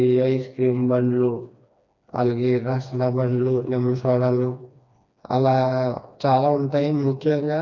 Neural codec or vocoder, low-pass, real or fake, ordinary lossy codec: codec, 16 kHz, 2 kbps, FreqCodec, smaller model; 7.2 kHz; fake; none